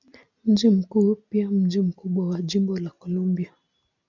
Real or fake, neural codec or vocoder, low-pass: real; none; 7.2 kHz